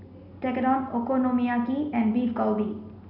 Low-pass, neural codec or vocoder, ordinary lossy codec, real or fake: 5.4 kHz; none; none; real